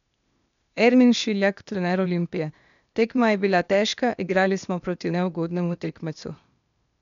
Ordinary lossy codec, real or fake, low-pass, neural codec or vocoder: MP3, 96 kbps; fake; 7.2 kHz; codec, 16 kHz, 0.8 kbps, ZipCodec